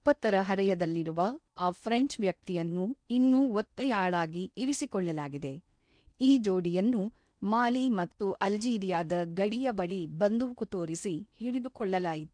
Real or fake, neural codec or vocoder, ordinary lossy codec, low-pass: fake; codec, 16 kHz in and 24 kHz out, 0.8 kbps, FocalCodec, streaming, 65536 codes; none; 9.9 kHz